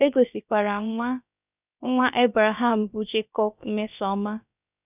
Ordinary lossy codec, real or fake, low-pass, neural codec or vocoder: none; fake; 3.6 kHz; codec, 16 kHz, about 1 kbps, DyCAST, with the encoder's durations